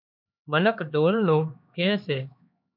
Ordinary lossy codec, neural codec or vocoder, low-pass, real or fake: MP3, 48 kbps; codec, 16 kHz, 2 kbps, X-Codec, HuBERT features, trained on LibriSpeech; 5.4 kHz; fake